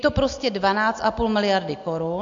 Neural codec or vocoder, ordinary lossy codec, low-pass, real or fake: none; AAC, 64 kbps; 7.2 kHz; real